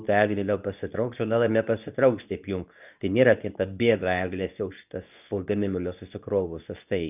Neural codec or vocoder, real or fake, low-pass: codec, 24 kHz, 0.9 kbps, WavTokenizer, medium speech release version 2; fake; 3.6 kHz